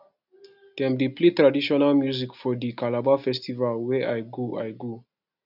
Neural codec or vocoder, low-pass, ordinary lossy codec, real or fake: none; 5.4 kHz; none; real